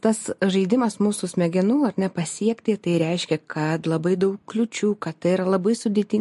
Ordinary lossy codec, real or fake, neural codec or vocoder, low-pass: MP3, 48 kbps; real; none; 14.4 kHz